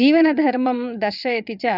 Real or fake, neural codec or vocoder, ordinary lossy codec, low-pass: fake; vocoder, 22.05 kHz, 80 mel bands, WaveNeXt; none; 5.4 kHz